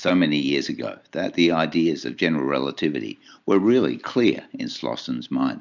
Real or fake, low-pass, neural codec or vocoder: real; 7.2 kHz; none